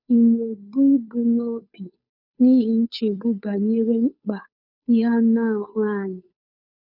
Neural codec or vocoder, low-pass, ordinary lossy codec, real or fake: codec, 16 kHz, 2 kbps, FunCodec, trained on Chinese and English, 25 frames a second; 5.4 kHz; none; fake